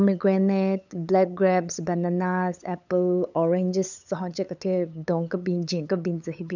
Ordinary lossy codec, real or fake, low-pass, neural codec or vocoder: none; fake; 7.2 kHz; codec, 16 kHz, 8 kbps, FunCodec, trained on LibriTTS, 25 frames a second